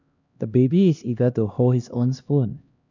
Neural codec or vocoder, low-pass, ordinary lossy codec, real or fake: codec, 16 kHz, 1 kbps, X-Codec, HuBERT features, trained on LibriSpeech; 7.2 kHz; none; fake